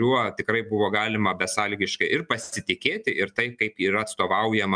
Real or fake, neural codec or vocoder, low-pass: real; none; 9.9 kHz